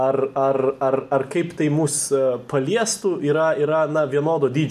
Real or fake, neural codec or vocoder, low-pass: real; none; 14.4 kHz